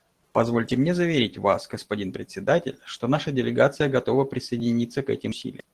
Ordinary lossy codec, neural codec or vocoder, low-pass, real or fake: Opus, 16 kbps; vocoder, 44.1 kHz, 128 mel bands every 512 samples, BigVGAN v2; 14.4 kHz; fake